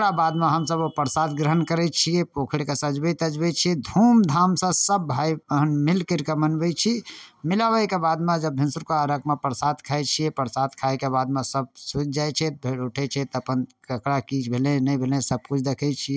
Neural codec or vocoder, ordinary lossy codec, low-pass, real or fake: none; none; none; real